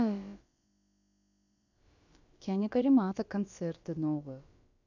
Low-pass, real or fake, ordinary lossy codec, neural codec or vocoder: 7.2 kHz; fake; none; codec, 16 kHz, about 1 kbps, DyCAST, with the encoder's durations